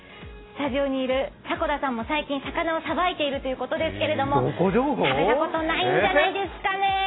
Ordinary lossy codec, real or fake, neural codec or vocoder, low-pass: AAC, 16 kbps; real; none; 7.2 kHz